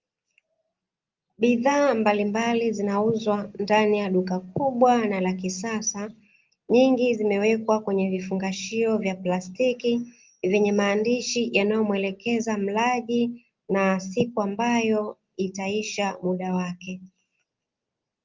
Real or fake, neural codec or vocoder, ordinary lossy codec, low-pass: real; none; Opus, 32 kbps; 7.2 kHz